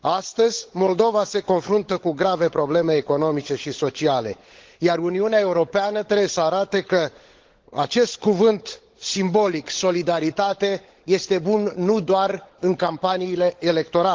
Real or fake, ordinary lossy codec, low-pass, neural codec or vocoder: fake; Opus, 16 kbps; 7.2 kHz; codec, 16 kHz, 16 kbps, FunCodec, trained on LibriTTS, 50 frames a second